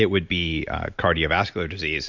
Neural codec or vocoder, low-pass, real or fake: none; 7.2 kHz; real